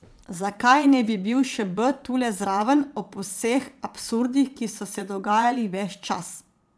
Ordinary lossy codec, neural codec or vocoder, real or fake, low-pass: none; vocoder, 22.05 kHz, 80 mel bands, Vocos; fake; none